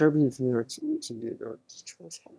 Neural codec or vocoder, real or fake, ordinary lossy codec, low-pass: autoencoder, 22.05 kHz, a latent of 192 numbers a frame, VITS, trained on one speaker; fake; none; none